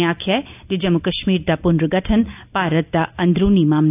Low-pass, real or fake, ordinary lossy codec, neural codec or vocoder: 3.6 kHz; real; AAC, 32 kbps; none